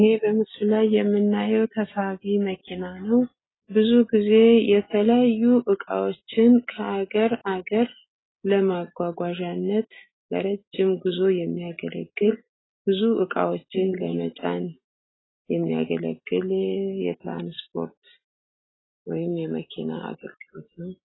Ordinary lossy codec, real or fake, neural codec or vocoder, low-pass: AAC, 16 kbps; real; none; 7.2 kHz